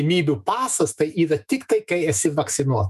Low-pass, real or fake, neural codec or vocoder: 14.4 kHz; fake; autoencoder, 48 kHz, 128 numbers a frame, DAC-VAE, trained on Japanese speech